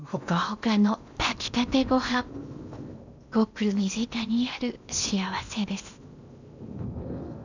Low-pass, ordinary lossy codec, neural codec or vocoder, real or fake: 7.2 kHz; none; codec, 16 kHz in and 24 kHz out, 0.8 kbps, FocalCodec, streaming, 65536 codes; fake